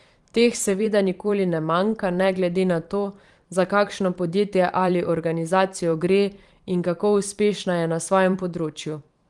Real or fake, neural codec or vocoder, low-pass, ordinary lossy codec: fake; vocoder, 44.1 kHz, 128 mel bands every 512 samples, BigVGAN v2; 10.8 kHz; Opus, 24 kbps